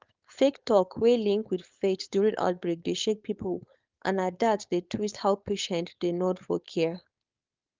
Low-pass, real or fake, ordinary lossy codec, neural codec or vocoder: 7.2 kHz; fake; Opus, 24 kbps; codec, 16 kHz, 4.8 kbps, FACodec